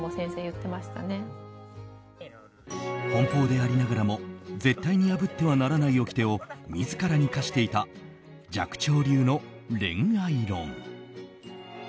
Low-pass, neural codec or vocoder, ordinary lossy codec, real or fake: none; none; none; real